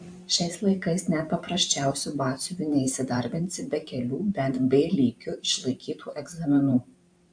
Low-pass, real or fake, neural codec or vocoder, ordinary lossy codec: 9.9 kHz; fake; vocoder, 44.1 kHz, 128 mel bands every 512 samples, BigVGAN v2; AAC, 64 kbps